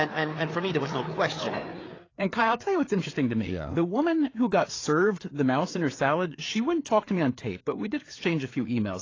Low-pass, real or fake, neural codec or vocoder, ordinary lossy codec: 7.2 kHz; fake; codec, 16 kHz, 4 kbps, FreqCodec, larger model; AAC, 32 kbps